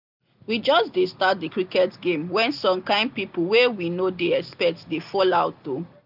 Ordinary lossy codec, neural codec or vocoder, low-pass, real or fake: none; none; 5.4 kHz; real